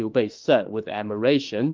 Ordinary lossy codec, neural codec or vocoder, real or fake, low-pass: Opus, 24 kbps; autoencoder, 48 kHz, 32 numbers a frame, DAC-VAE, trained on Japanese speech; fake; 7.2 kHz